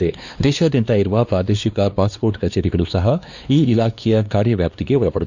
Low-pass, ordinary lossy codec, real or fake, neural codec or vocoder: 7.2 kHz; none; fake; codec, 16 kHz, 2 kbps, X-Codec, WavLM features, trained on Multilingual LibriSpeech